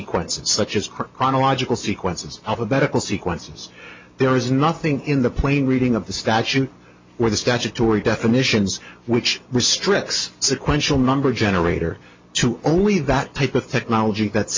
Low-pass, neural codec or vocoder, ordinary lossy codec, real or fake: 7.2 kHz; none; MP3, 48 kbps; real